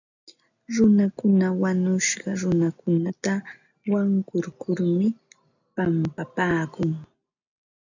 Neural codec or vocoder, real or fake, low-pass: none; real; 7.2 kHz